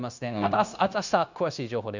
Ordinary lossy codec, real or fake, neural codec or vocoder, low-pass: none; fake; codec, 16 kHz, 0.9 kbps, LongCat-Audio-Codec; 7.2 kHz